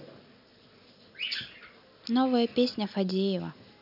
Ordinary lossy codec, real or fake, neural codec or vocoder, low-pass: none; real; none; 5.4 kHz